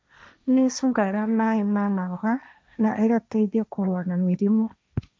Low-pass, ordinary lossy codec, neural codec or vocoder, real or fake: none; none; codec, 16 kHz, 1.1 kbps, Voila-Tokenizer; fake